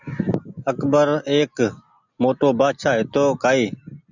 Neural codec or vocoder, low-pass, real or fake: none; 7.2 kHz; real